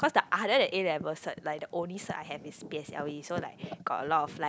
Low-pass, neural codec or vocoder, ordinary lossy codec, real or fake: none; none; none; real